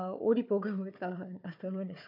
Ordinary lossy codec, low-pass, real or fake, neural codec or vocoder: none; 5.4 kHz; fake; codec, 16 kHz, 4 kbps, FunCodec, trained on Chinese and English, 50 frames a second